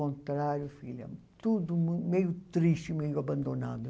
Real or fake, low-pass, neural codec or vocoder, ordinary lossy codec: real; none; none; none